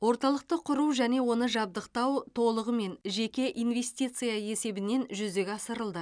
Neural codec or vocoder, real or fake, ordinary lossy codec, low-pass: none; real; none; 9.9 kHz